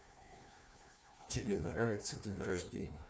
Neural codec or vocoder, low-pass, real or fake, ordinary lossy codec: codec, 16 kHz, 1 kbps, FunCodec, trained on Chinese and English, 50 frames a second; none; fake; none